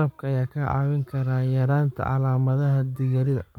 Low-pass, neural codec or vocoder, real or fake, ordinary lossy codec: 19.8 kHz; codec, 44.1 kHz, 7.8 kbps, Pupu-Codec; fake; MP3, 96 kbps